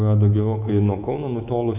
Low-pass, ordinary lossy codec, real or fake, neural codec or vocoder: 3.6 kHz; AAC, 32 kbps; fake; codec, 24 kHz, 3.1 kbps, DualCodec